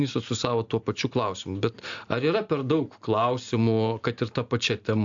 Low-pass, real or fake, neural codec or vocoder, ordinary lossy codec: 7.2 kHz; real; none; AAC, 64 kbps